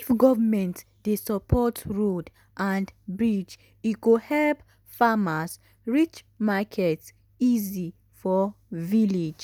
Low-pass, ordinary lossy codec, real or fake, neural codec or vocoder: none; none; real; none